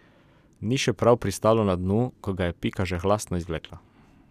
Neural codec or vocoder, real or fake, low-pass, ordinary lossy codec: none; real; 14.4 kHz; none